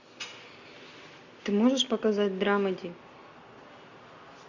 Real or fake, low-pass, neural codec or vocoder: real; 7.2 kHz; none